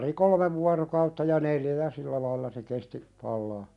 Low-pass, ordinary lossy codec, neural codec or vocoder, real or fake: 10.8 kHz; none; none; real